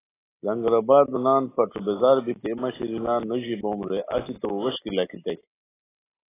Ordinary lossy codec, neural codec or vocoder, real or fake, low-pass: AAC, 16 kbps; none; real; 3.6 kHz